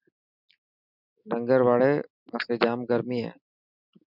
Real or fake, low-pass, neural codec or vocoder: real; 5.4 kHz; none